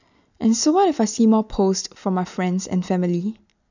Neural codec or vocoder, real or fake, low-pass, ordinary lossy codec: none; real; 7.2 kHz; none